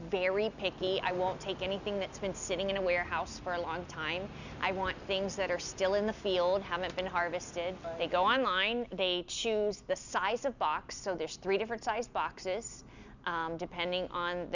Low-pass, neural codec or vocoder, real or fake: 7.2 kHz; none; real